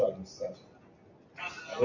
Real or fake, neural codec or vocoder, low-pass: real; none; 7.2 kHz